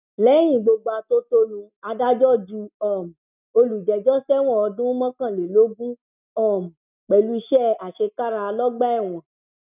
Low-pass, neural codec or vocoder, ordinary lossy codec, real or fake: 3.6 kHz; none; none; real